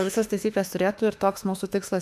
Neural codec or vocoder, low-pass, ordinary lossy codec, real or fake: autoencoder, 48 kHz, 32 numbers a frame, DAC-VAE, trained on Japanese speech; 14.4 kHz; AAC, 96 kbps; fake